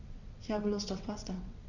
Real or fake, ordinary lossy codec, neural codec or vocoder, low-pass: fake; none; vocoder, 22.05 kHz, 80 mel bands, WaveNeXt; 7.2 kHz